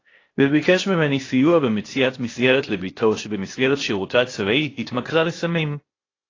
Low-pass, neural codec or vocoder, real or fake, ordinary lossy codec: 7.2 kHz; codec, 16 kHz, 0.8 kbps, ZipCodec; fake; AAC, 32 kbps